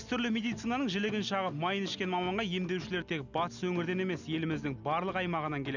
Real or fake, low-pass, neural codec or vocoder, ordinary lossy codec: real; 7.2 kHz; none; none